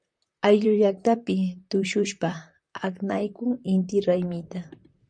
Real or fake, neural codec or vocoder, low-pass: fake; vocoder, 44.1 kHz, 128 mel bands, Pupu-Vocoder; 9.9 kHz